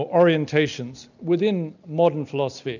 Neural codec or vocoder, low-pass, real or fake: none; 7.2 kHz; real